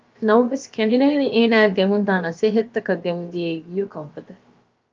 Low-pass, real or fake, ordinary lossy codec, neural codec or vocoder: 7.2 kHz; fake; Opus, 24 kbps; codec, 16 kHz, about 1 kbps, DyCAST, with the encoder's durations